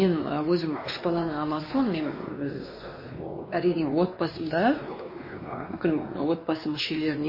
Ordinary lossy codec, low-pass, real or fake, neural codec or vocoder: MP3, 24 kbps; 5.4 kHz; fake; codec, 16 kHz, 2 kbps, X-Codec, WavLM features, trained on Multilingual LibriSpeech